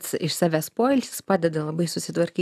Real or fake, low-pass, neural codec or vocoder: real; 14.4 kHz; none